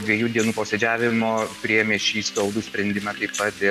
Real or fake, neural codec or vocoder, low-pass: real; none; 14.4 kHz